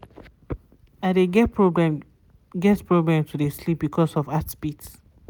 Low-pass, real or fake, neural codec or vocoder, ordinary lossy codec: none; real; none; none